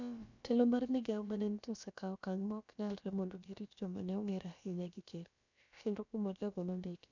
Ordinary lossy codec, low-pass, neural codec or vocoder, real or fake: none; 7.2 kHz; codec, 16 kHz, about 1 kbps, DyCAST, with the encoder's durations; fake